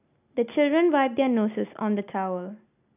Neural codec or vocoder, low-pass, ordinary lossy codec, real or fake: none; 3.6 kHz; none; real